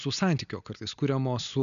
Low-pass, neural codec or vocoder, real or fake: 7.2 kHz; none; real